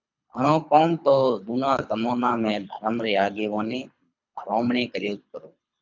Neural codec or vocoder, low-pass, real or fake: codec, 24 kHz, 3 kbps, HILCodec; 7.2 kHz; fake